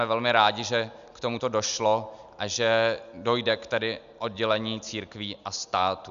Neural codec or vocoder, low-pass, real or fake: none; 7.2 kHz; real